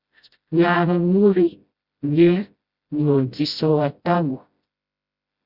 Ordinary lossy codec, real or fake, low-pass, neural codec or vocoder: Opus, 64 kbps; fake; 5.4 kHz; codec, 16 kHz, 0.5 kbps, FreqCodec, smaller model